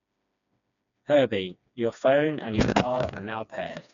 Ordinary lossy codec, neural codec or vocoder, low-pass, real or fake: none; codec, 16 kHz, 2 kbps, FreqCodec, smaller model; 7.2 kHz; fake